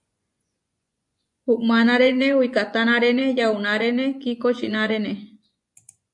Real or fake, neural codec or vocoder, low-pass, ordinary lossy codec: fake; vocoder, 24 kHz, 100 mel bands, Vocos; 10.8 kHz; AAC, 64 kbps